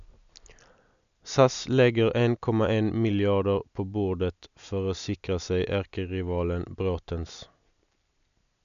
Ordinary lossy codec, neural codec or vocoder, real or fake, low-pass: none; none; real; 7.2 kHz